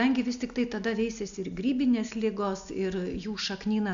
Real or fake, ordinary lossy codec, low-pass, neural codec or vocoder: real; AAC, 64 kbps; 7.2 kHz; none